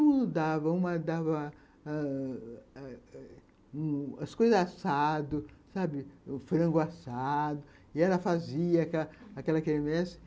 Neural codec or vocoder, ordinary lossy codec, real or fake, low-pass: none; none; real; none